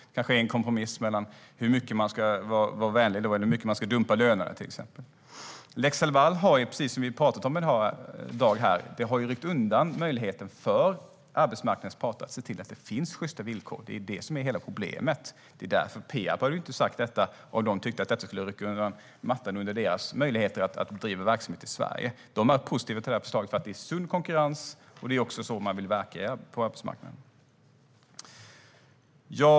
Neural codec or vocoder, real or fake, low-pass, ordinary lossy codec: none; real; none; none